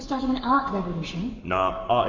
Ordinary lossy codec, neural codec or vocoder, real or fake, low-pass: MP3, 48 kbps; codec, 44.1 kHz, 7.8 kbps, Pupu-Codec; fake; 7.2 kHz